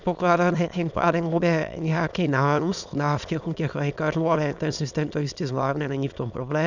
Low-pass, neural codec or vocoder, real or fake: 7.2 kHz; autoencoder, 22.05 kHz, a latent of 192 numbers a frame, VITS, trained on many speakers; fake